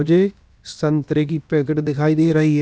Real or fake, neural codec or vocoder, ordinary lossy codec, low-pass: fake; codec, 16 kHz, about 1 kbps, DyCAST, with the encoder's durations; none; none